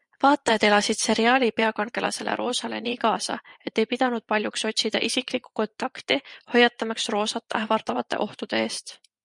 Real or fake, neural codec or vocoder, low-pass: fake; vocoder, 24 kHz, 100 mel bands, Vocos; 10.8 kHz